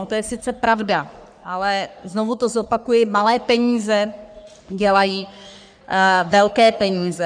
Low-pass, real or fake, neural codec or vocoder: 9.9 kHz; fake; codec, 44.1 kHz, 3.4 kbps, Pupu-Codec